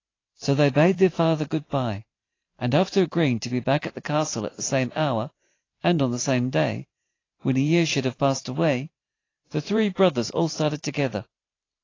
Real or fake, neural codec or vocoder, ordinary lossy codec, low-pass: fake; vocoder, 44.1 kHz, 128 mel bands every 512 samples, BigVGAN v2; AAC, 32 kbps; 7.2 kHz